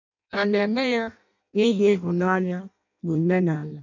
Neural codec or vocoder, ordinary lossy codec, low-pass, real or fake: codec, 16 kHz in and 24 kHz out, 0.6 kbps, FireRedTTS-2 codec; none; 7.2 kHz; fake